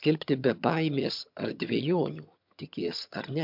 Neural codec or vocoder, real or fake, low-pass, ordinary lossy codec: vocoder, 22.05 kHz, 80 mel bands, HiFi-GAN; fake; 5.4 kHz; MP3, 48 kbps